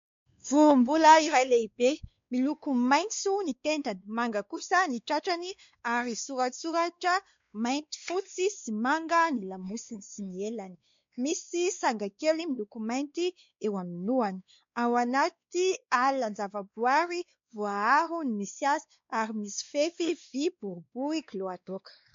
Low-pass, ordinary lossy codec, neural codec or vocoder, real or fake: 7.2 kHz; MP3, 48 kbps; codec, 16 kHz, 2 kbps, X-Codec, WavLM features, trained on Multilingual LibriSpeech; fake